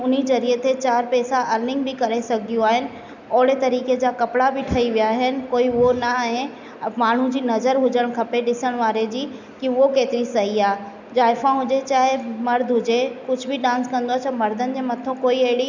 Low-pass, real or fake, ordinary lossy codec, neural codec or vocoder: 7.2 kHz; real; none; none